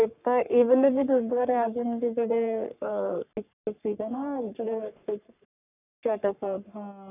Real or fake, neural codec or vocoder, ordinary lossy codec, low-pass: fake; codec, 44.1 kHz, 3.4 kbps, Pupu-Codec; none; 3.6 kHz